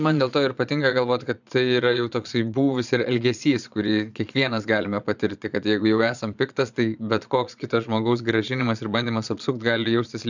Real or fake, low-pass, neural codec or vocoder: fake; 7.2 kHz; vocoder, 22.05 kHz, 80 mel bands, Vocos